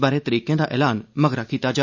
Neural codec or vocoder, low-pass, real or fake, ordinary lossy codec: none; 7.2 kHz; real; none